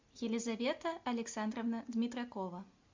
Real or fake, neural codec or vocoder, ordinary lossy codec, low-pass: real; none; MP3, 64 kbps; 7.2 kHz